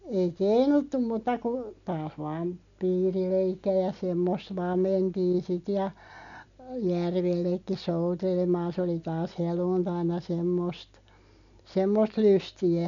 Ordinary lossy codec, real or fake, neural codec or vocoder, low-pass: none; real; none; 7.2 kHz